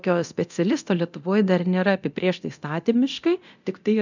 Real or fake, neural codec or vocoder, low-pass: fake; codec, 24 kHz, 0.9 kbps, DualCodec; 7.2 kHz